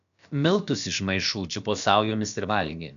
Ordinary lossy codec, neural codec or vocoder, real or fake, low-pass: AAC, 96 kbps; codec, 16 kHz, about 1 kbps, DyCAST, with the encoder's durations; fake; 7.2 kHz